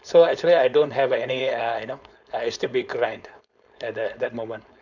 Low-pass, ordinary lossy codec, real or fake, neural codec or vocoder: 7.2 kHz; none; fake; codec, 16 kHz, 4.8 kbps, FACodec